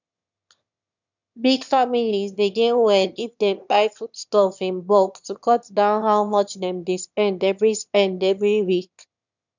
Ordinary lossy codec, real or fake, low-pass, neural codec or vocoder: none; fake; 7.2 kHz; autoencoder, 22.05 kHz, a latent of 192 numbers a frame, VITS, trained on one speaker